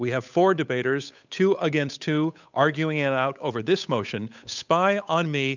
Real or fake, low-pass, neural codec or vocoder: fake; 7.2 kHz; codec, 16 kHz, 8 kbps, FunCodec, trained on Chinese and English, 25 frames a second